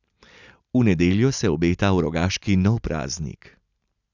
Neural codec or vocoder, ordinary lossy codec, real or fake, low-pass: none; none; real; 7.2 kHz